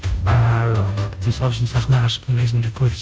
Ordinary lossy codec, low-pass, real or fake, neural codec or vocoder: none; none; fake; codec, 16 kHz, 0.5 kbps, FunCodec, trained on Chinese and English, 25 frames a second